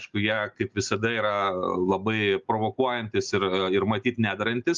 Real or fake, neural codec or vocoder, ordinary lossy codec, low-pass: real; none; Opus, 32 kbps; 7.2 kHz